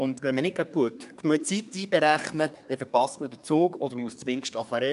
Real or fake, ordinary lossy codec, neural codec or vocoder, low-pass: fake; none; codec, 24 kHz, 1 kbps, SNAC; 10.8 kHz